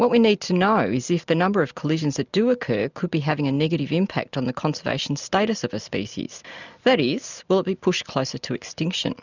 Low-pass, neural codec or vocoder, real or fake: 7.2 kHz; none; real